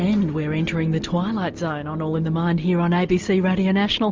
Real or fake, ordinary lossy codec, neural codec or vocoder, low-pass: real; Opus, 32 kbps; none; 7.2 kHz